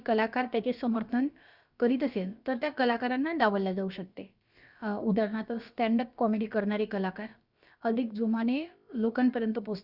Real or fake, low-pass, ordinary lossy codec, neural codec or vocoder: fake; 5.4 kHz; Opus, 64 kbps; codec, 16 kHz, about 1 kbps, DyCAST, with the encoder's durations